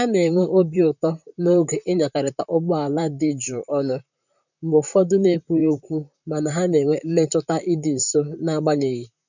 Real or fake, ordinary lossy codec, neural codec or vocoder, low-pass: fake; none; vocoder, 44.1 kHz, 128 mel bands, Pupu-Vocoder; 7.2 kHz